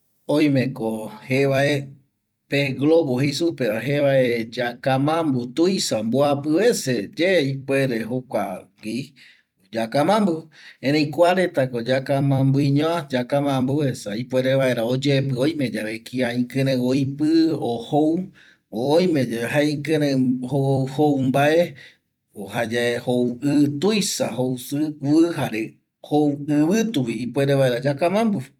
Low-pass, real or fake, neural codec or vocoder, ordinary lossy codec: 19.8 kHz; fake; vocoder, 44.1 kHz, 128 mel bands every 256 samples, BigVGAN v2; none